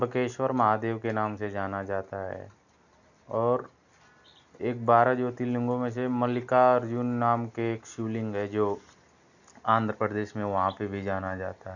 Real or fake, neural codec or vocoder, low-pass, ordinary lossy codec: real; none; 7.2 kHz; none